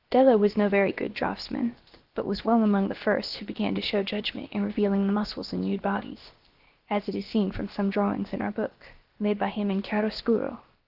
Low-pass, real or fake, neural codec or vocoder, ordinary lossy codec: 5.4 kHz; fake; codec, 16 kHz, about 1 kbps, DyCAST, with the encoder's durations; Opus, 16 kbps